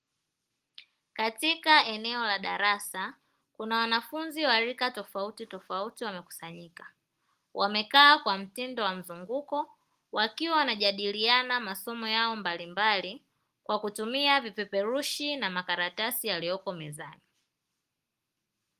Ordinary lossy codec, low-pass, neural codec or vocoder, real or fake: Opus, 24 kbps; 14.4 kHz; none; real